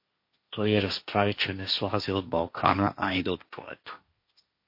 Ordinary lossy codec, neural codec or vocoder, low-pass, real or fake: MP3, 32 kbps; codec, 16 kHz, 1.1 kbps, Voila-Tokenizer; 5.4 kHz; fake